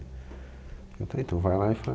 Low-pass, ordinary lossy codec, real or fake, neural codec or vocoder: none; none; real; none